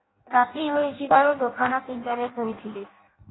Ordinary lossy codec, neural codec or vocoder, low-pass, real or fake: AAC, 16 kbps; codec, 16 kHz in and 24 kHz out, 0.6 kbps, FireRedTTS-2 codec; 7.2 kHz; fake